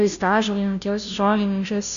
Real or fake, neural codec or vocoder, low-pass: fake; codec, 16 kHz, 0.5 kbps, FunCodec, trained on Chinese and English, 25 frames a second; 7.2 kHz